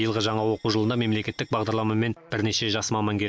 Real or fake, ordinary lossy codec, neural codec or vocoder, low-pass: real; none; none; none